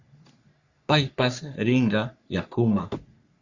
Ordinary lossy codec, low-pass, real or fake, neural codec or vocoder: Opus, 64 kbps; 7.2 kHz; fake; codec, 44.1 kHz, 3.4 kbps, Pupu-Codec